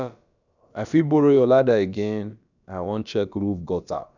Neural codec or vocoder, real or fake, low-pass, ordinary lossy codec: codec, 16 kHz, about 1 kbps, DyCAST, with the encoder's durations; fake; 7.2 kHz; none